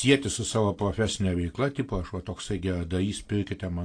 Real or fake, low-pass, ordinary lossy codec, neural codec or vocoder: real; 9.9 kHz; AAC, 48 kbps; none